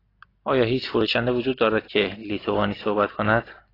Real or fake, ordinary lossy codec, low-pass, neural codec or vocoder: real; AAC, 24 kbps; 5.4 kHz; none